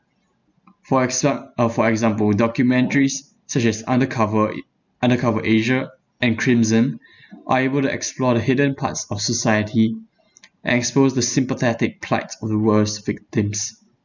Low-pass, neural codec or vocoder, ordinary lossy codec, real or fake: 7.2 kHz; none; none; real